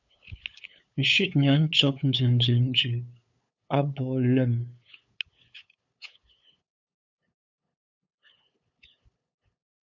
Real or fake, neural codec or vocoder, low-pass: fake; codec, 16 kHz, 8 kbps, FunCodec, trained on LibriTTS, 25 frames a second; 7.2 kHz